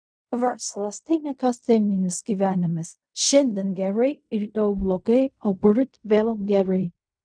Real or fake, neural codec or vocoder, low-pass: fake; codec, 16 kHz in and 24 kHz out, 0.4 kbps, LongCat-Audio-Codec, fine tuned four codebook decoder; 9.9 kHz